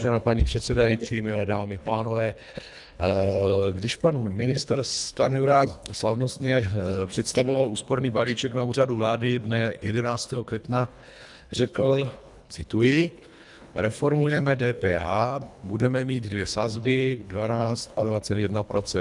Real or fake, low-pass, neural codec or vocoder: fake; 10.8 kHz; codec, 24 kHz, 1.5 kbps, HILCodec